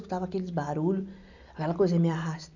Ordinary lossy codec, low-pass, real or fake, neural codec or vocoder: none; 7.2 kHz; real; none